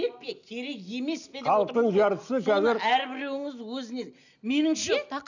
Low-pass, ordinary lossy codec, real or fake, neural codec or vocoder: 7.2 kHz; none; real; none